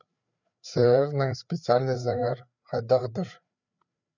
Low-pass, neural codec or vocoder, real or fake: 7.2 kHz; codec, 16 kHz, 4 kbps, FreqCodec, larger model; fake